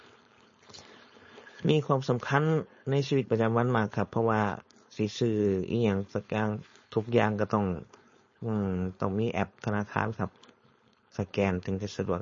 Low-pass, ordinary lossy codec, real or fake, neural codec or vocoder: 7.2 kHz; MP3, 32 kbps; fake; codec, 16 kHz, 4.8 kbps, FACodec